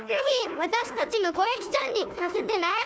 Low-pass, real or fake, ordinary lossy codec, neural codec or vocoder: none; fake; none; codec, 16 kHz, 1 kbps, FunCodec, trained on Chinese and English, 50 frames a second